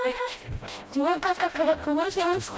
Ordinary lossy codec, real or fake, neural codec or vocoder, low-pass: none; fake; codec, 16 kHz, 0.5 kbps, FreqCodec, smaller model; none